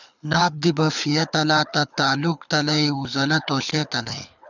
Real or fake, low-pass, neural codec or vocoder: fake; 7.2 kHz; codec, 24 kHz, 6 kbps, HILCodec